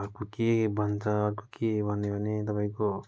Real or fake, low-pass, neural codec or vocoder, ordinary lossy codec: real; none; none; none